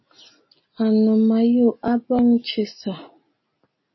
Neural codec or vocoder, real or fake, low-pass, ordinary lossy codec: none; real; 7.2 kHz; MP3, 24 kbps